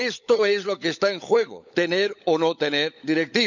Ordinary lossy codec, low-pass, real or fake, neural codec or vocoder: none; 7.2 kHz; fake; codec, 16 kHz, 16 kbps, FunCodec, trained on LibriTTS, 50 frames a second